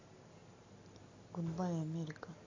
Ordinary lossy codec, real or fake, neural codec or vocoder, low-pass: AAC, 32 kbps; real; none; 7.2 kHz